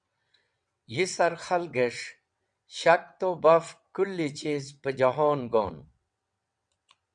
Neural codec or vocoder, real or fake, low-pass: vocoder, 22.05 kHz, 80 mel bands, WaveNeXt; fake; 9.9 kHz